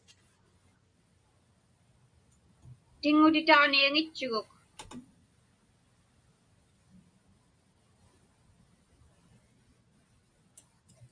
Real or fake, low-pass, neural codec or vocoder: real; 9.9 kHz; none